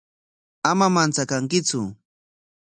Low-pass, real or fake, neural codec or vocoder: 9.9 kHz; real; none